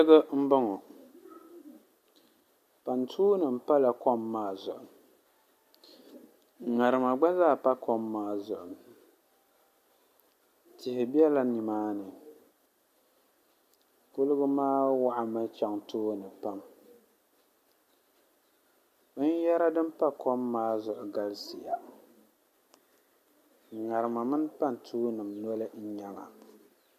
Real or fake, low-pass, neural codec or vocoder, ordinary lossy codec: real; 14.4 kHz; none; MP3, 64 kbps